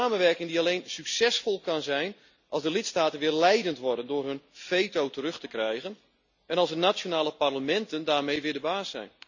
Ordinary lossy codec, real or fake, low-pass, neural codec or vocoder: none; real; 7.2 kHz; none